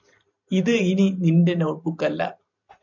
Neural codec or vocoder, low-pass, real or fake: vocoder, 44.1 kHz, 128 mel bands every 512 samples, BigVGAN v2; 7.2 kHz; fake